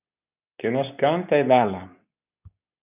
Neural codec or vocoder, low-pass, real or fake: codec, 24 kHz, 0.9 kbps, WavTokenizer, medium speech release version 2; 3.6 kHz; fake